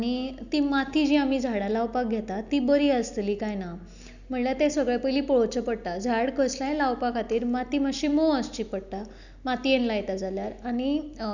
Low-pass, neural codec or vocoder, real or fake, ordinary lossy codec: 7.2 kHz; none; real; none